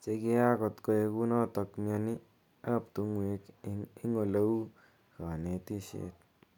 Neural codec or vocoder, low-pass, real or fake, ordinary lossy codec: none; 19.8 kHz; real; none